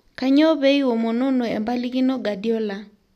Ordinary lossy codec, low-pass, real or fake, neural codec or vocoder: none; 14.4 kHz; real; none